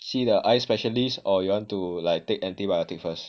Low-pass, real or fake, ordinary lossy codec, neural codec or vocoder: none; real; none; none